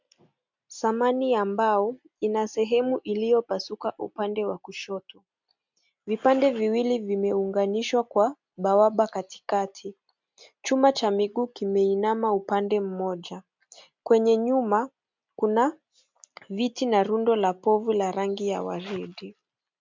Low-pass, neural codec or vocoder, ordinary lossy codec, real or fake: 7.2 kHz; none; MP3, 64 kbps; real